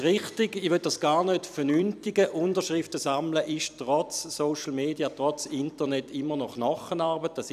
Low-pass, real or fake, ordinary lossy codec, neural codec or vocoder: 14.4 kHz; fake; none; vocoder, 44.1 kHz, 128 mel bands every 256 samples, BigVGAN v2